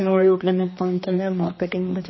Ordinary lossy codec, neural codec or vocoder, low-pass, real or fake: MP3, 24 kbps; codec, 16 kHz, 2 kbps, X-Codec, HuBERT features, trained on general audio; 7.2 kHz; fake